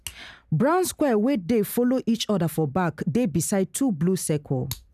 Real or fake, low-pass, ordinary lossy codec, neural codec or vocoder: real; 14.4 kHz; none; none